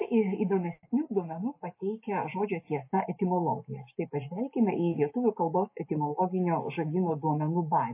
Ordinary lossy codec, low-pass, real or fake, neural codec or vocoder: MP3, 24 kbps; 3.6 kHz; real; none